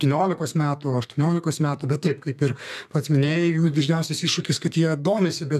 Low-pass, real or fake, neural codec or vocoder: 14.4 kHz; fake; codec, 32 kHz, 1.9 kbps, SNAC